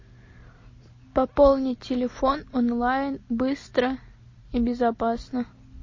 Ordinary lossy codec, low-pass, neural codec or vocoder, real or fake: MP3, 32 kbps; 7.2 kHz; none; real